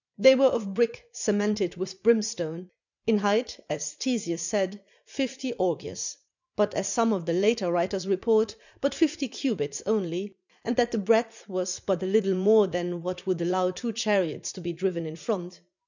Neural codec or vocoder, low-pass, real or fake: vocoder, 44.1 kHz, 80 mel bands, Vocos; 7.2 kHz; fake